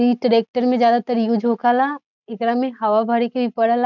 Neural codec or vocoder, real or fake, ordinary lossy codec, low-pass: none; real; none; 7.2 kHz